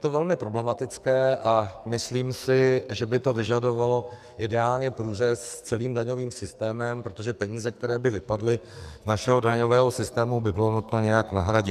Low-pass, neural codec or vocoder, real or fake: 14.4 kHz; codec, 44.1 kHz, 2.6 kbps, SNAC; fake